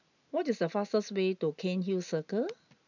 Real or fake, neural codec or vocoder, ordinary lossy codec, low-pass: real; none; none; 7.2 kHz